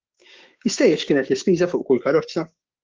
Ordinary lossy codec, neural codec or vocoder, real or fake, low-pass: Opus, 32 kbps; codec, 16 kHz, 6 kbps, DAC; fake; 7.2 kHz